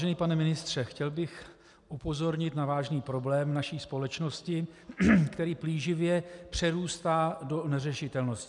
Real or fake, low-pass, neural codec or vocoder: real; 10.8 kHz; none